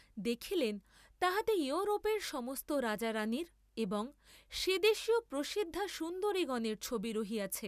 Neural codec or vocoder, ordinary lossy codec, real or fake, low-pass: none; none; real; 14.4 kHz